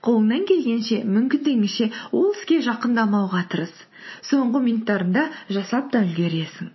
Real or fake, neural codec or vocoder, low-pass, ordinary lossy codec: real; none; 7.2 kHz; MP3, 24 kbps